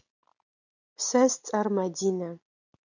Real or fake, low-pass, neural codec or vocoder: real; 7.2 kHz; none